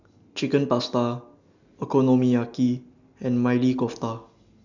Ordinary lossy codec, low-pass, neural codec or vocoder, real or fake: none; 7.2 kHz; none; real